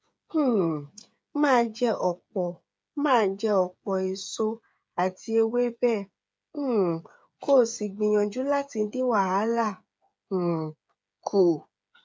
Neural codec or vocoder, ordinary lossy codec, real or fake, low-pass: codec, 16 kHz, 8 kbps, FreqCodec, smaller model; none; fake; none